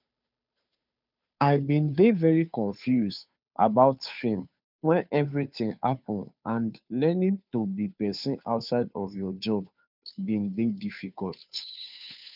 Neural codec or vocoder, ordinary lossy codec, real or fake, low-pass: codec, 16 kHz, 2 kbps, FunCodec, trained on Chinese and English, 25 frames a second; none; fake; 5.4 kHz